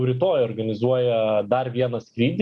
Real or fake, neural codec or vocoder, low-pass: real; none; 10.8 kHz